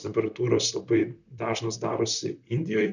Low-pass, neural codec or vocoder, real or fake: 7.2 kHz; vocoder, 44.1 kHz, 128 mel bands, Pupu-Vocoder; fake